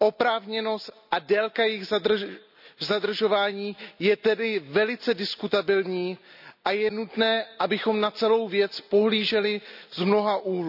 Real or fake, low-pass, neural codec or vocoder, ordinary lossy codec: real; 5.4 kHz; none; none